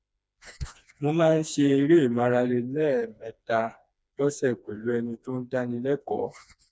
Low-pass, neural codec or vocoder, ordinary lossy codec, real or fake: none; codec, 16 kHz, 2 kbps, FreqCodec, smaller model; none; fake